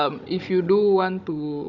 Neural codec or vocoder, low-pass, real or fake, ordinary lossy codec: codec, 16 kHz, 16 kbps, FunCodec, trained on Chinese and English, 50 frames a second; 7.2 kHz; fake; none